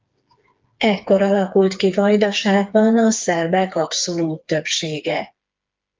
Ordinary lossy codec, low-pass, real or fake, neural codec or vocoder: Opus, 24 kbps; 7.2 kHz; fake; codec, 16 kHz, 4 kbps, FreqCodec, smaller model